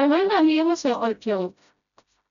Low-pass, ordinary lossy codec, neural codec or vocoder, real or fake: 7.2 kHz; none; codec, 16 kHz, 0.5 kbps, FreqCodec, smaller model; fake